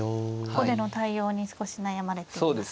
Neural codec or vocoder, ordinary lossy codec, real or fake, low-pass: none; none; real; none